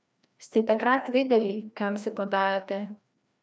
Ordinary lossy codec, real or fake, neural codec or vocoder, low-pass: none; fake; codec, 16 kHz, 1 kbps, FreqCodec, larger model; none